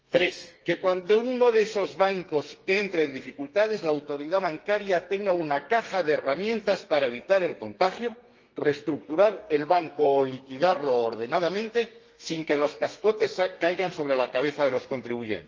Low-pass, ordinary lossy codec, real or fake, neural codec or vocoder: 7.2 kHz; Opus, 24 kbps; fake; codec, 32 kHz, 1.9 kbps, SNAC